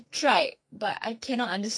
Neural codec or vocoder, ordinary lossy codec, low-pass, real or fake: codec, 44.1 kHz, 2.6 kbps, SNAC; MP3, 48 kbps; 9.9 kHz; fake